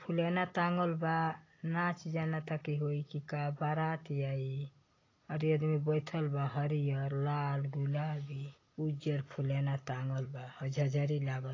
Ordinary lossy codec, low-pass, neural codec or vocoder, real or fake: AAC, 32 kbps; 7.2 kHz; none; real